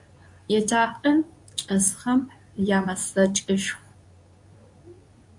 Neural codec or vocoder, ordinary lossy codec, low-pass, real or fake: codec, 24 kHz, 0.9 kbps, WavTokenizer, medium speech release version 2; AAC, 64 kbps; 10.8 kHz; fake